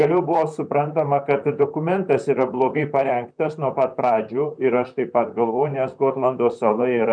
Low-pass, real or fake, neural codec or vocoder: 9.9 kHz; fake; vocoder, 44.1 kHz, 128 mel bands, Pupu-Vocoder